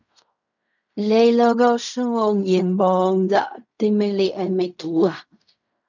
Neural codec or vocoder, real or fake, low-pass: codec, 16 kHz in and 24 kHz out, 0.4 kbps, LongCat-Audio-Codec, fine tuned four codebook decoder; fake; 7.2 kHz